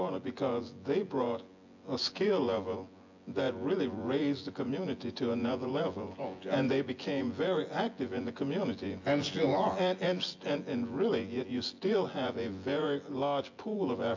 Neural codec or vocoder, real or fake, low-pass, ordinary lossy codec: vocoder, 24 kHz, 100 mel bands, Vocos; fake; 7.2 kHz; AAC, 48 kbps